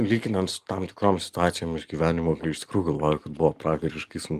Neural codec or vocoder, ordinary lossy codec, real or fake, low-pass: none; Opus, 32 kbps; real; 14.4 kHz